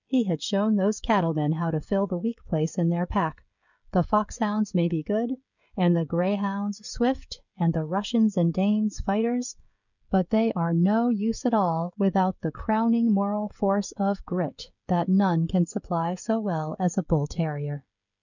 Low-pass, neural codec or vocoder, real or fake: 7.2 kHz; codec, 16 kHz, 16 kbps, FreqCodec, smaller model; fake